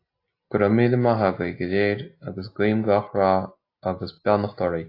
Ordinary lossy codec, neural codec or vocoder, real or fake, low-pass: AAC, 32 kbps; none; real; 5.4 kHz